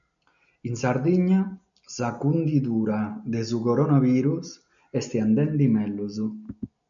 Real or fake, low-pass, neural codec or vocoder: real; 7.2 kHz; none